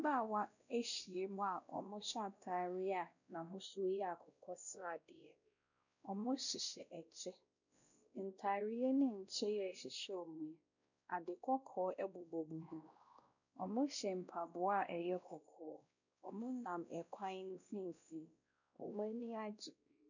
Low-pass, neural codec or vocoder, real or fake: 7.2 kHz; codec, 16 kHz, 1 kbps, X-Codec, WavLM features, trained on Multilingual LibriSpeech; fake